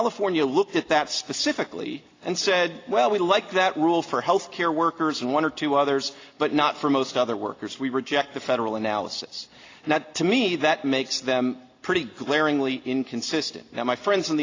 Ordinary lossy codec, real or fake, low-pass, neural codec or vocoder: AAC, 32 kbps; real; 7.2 kHz; none